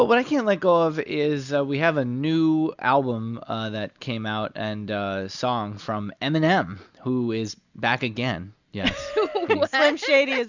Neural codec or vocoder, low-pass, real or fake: none; 7.2 kHz; real